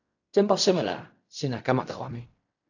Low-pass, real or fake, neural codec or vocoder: 7.2 kHz; fake; codec, 16 kHz in and 24 kHz out, 0.4 kbps, LongCat-Audio-Codec, fine tuned four codebook decoder